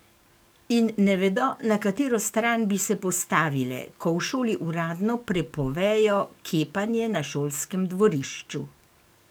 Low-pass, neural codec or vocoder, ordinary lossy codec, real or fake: none; codec, 44.1 kHz, 7.8 kbps, DAC; none; fake